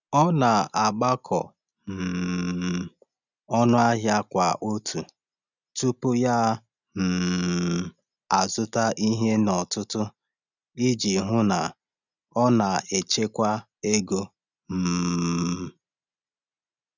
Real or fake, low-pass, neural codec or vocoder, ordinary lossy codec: real; 7.2 kHz; none; none